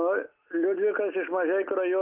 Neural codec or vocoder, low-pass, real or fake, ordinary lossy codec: none; 3.6 kHz; real; Opus, 32 kbps